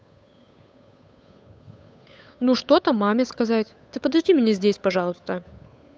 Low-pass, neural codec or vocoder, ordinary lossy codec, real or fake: none; codec, 16 kHz, 8 kbps, FunCodec, trained on Chinese and English, 25 frames a second; none; fake